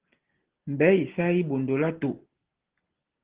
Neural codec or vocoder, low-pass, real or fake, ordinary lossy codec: none; 3.6 kHz; real; Opus, 16 kbps